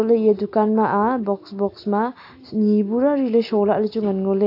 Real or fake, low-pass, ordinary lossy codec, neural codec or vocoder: real; 5.4 kHz; AAC, 32 kbps; none